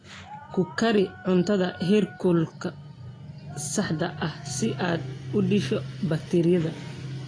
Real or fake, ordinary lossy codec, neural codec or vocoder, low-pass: real; AAC, 32 kbps; none; 9.9 kHz